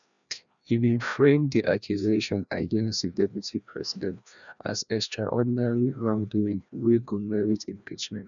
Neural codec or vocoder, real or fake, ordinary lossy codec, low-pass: codec, 16 kHz, 1 kbps, FreqCodec, larger model; fake; none; 7.2 kHz